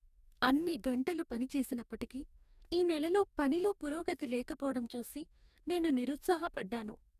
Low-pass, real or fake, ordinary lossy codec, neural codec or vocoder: 14.4 kHz; fake; none; codec, 44.1 kHz, 2.6 kbps, DAC